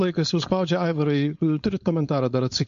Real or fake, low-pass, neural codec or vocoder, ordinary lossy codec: fake; 7.2 kHz; codec, 16 kHz, 4.8 kbps, FACodec; MP3, 48 kbps